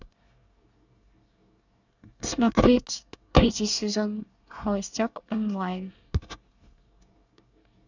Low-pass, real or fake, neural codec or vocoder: 7.2 kHz; fake; codec, 24 kHz, 1 kbps, SNAC